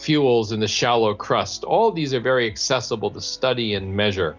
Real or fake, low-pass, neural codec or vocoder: real; 7.2 kHz; none